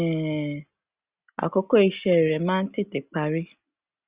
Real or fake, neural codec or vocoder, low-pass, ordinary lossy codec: real; none; 3.6 kHz; Opus, 64 kbps